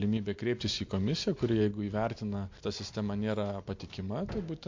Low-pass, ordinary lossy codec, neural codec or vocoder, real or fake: 7.2 kHz; MP3, 48 kbps; none; real